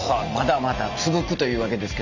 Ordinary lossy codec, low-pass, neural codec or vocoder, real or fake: none; 7.2 kHz; none; real